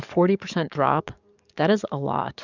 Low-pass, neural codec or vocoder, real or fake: 7.2 kHz; codec, 44.1 kHz, 7.8 kbps, DAC; fake